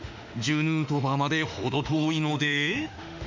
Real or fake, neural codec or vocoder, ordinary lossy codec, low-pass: fake; autoencoder, 48 kHz, 32 numbers a frame, DAC-VAE, trained on Japanese speech; MP3, 64 kbps; 7.2 kHz